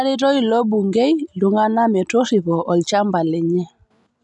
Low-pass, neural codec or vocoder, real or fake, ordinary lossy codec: 10.8 kHz; none; real; none